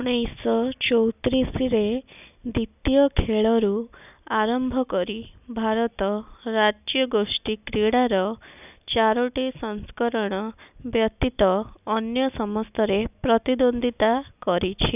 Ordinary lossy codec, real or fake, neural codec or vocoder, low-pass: none; real; none; 3.6 kHz